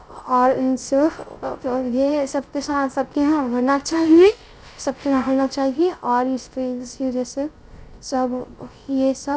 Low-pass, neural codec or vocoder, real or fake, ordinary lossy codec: none; codec, 16 kHz, 0.3 kbps, FocalCodec; fake; none